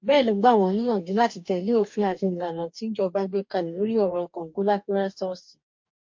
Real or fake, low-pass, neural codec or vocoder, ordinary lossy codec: fake; 7.2 kHz; codec, 44.1 kHz, 2.6 kbps, DAC; MP3, 48 kbps